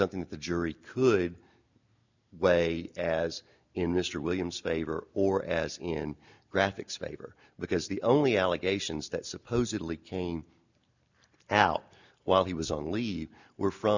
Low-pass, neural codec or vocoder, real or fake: 7.2 kHz; none; real